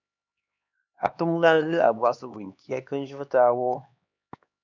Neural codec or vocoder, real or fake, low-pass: codec, 16 kHz, 2 kbps, X-Codec, HuBERT features, trained on LibriSpeech; fake; 7.2 kHz